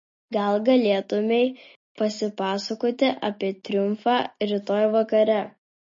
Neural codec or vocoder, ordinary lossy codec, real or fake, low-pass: none; MP3, 32 kbps; real; 7.2 kHz